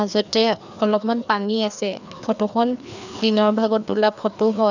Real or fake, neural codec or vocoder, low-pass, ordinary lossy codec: fake; codec, 16 kHz, 2 kbps, FreqCodec, larger model; 7.2 kHz; none